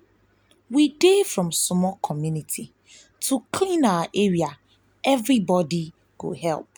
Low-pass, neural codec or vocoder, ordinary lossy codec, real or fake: none; none; none; real